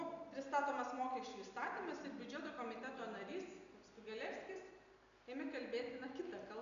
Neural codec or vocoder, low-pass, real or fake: none; 7.2 kHz; real